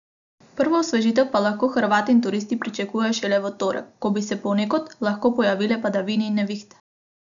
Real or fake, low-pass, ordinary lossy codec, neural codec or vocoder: real; 7.2 kHz; none; none